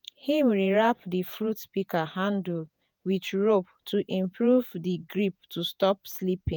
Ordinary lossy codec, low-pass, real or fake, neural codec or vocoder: none; none; fake; vocoder, 48 kHz, 128 mel bands, Vocos